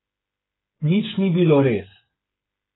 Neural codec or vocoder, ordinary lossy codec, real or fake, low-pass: codec, 16 kHz, 8 kbps, FreqCodec, smaller model; AAC, 16 kbps; fake; 7.2 kHz